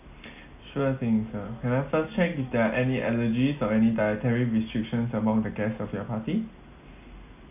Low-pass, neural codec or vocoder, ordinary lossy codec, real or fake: 3.6 kHz; none; none; real